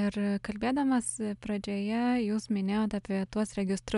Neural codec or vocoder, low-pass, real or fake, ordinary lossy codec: none; 10.8 kHz; real; MP3, 96 kbps